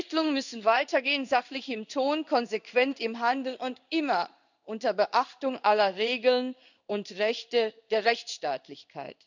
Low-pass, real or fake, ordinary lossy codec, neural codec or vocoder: 7.2 kHz; fake; none; codec, 16 kHz in and 24 kHz out, 1 kbps, XY-Tokenizer